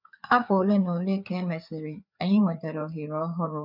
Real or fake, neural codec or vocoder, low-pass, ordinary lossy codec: fake; codec, 16 kHz, 4 kbps, FreqCodec, larger model; 5.4 kHz; none